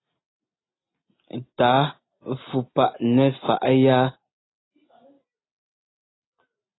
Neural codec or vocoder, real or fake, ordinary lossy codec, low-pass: none; real; AAC, 16 kbps; 7.2 kHz